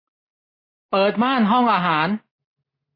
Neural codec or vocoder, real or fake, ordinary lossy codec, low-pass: none; real; MP3, 24 kbps; 5.4 kHz